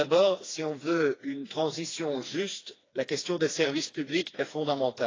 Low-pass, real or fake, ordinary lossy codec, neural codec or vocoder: 7.2 kHz; fake; AAC, 32 kbps; codec, 16 kHz, 2 kbps, FreqCodec, smaller model